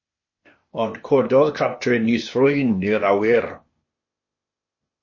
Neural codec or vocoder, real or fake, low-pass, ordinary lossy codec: codec, 16 kHz, 0.8 kbps, ZipCodec; fake; 7.2 kHz; MP3, 32 kbps